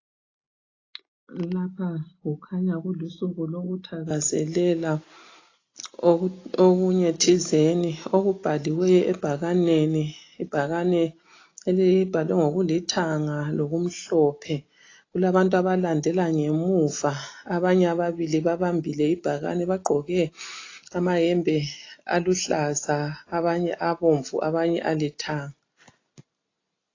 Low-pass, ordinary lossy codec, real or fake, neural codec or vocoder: 7.2 kHz; AAC, 32 kbps; real; none